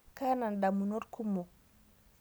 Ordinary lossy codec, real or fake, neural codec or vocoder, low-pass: none; real; none; none